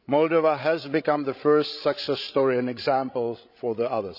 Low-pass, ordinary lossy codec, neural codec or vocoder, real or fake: 5.4 kHz; none; codec, 16 kHz, 16 kbps, FreqCodec, larger model; fake